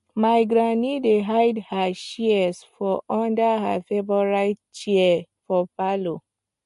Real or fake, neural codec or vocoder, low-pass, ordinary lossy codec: real; none; 14.4 kHz; MP3, 48 kbps